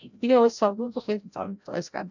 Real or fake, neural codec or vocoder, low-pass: fake; codec, 16 kHz, 0.5 kbps, FreqCodec, larger model; 7.2 kHz